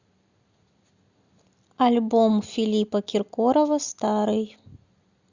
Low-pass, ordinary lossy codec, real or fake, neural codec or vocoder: 7.2 kHz; Opus, 64 kbps; real; none